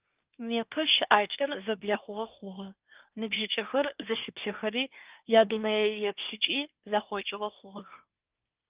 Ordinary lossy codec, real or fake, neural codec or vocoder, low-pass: Opus, 24 kbps; fake; codec, 24 kHz, 1 kbps, SNAC; 3.6 kHz